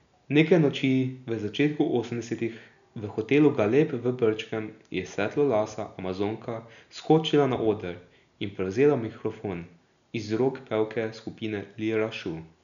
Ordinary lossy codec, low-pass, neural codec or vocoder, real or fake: MP3, 96 kbps; 7.2 kHz; none; real